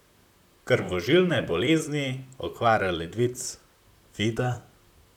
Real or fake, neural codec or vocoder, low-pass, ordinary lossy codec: fake; vocoder, 44.1 kHz, 128 mel bands, Pupu-Vocoder; 19.8 kHz; none